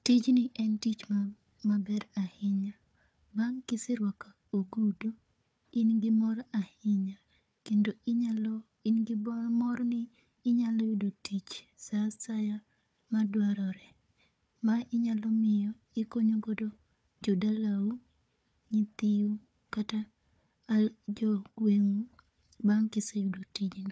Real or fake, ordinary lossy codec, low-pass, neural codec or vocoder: fake; none; none; codec, 16 kHz, 4 kbps, FunCodec, trained on Chinese and English, 50 frames a second